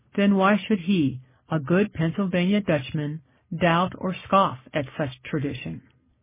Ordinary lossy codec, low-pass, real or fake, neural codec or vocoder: MP3, 16 kbps; 3.6 kHz; real; none